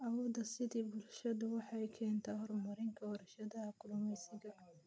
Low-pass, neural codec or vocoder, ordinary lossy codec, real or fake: none; none; none; real